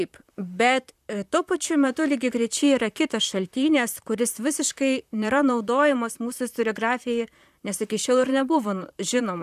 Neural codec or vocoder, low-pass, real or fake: vocoder, 44.1 kHz, 128 mel bands, Pupu-Vocoder; 14.4 kHz; fake